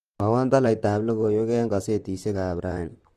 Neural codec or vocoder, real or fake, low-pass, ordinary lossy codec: vocoder, 44.1 kHz, 128 mel bands, Pupu-Vocoder; fake; 14.4 kHz; Opus, 24 kbps